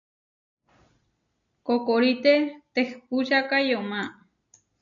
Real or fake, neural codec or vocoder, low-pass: real; none; 7.2 kHz